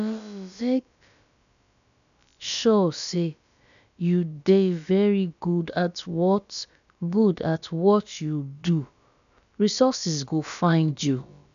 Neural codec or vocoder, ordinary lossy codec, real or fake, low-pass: codec, 16 kHz, about 1 kbps, DyCAST, with the encoder's durations; none; fake; 7.2 kHz